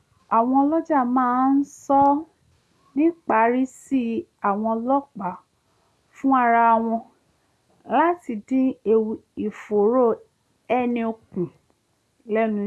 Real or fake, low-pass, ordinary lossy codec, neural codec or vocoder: real; none; none; none